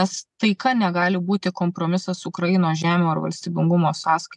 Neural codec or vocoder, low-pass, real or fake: none; 10.8 kHz; real